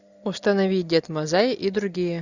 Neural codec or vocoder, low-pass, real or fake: none; 7.2 kHz; real